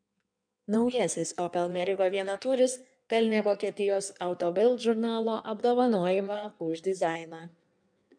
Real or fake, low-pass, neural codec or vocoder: fake; 9.9 kHz; codec, 16 kHz in and 24 kHz out, 1.1 kbps, FireRedTTS-2 codec